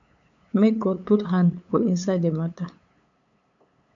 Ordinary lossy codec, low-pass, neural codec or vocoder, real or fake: MP3, 64 kbps; 7.2 kHz; codec, 16 kHz, 8 kbps, FunCodec, trained on LibriTTS, 25 frames a second; fake